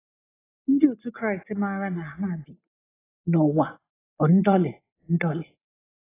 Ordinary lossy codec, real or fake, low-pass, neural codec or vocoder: AAC, 16 kbps; real; 3.6 kHz; none